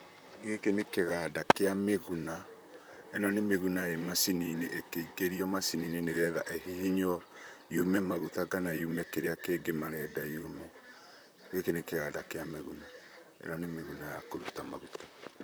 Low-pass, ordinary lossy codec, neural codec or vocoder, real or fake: none; none; vocoder, 44.1 kHz, 128 mel bands, Pupu-Vocoder; fake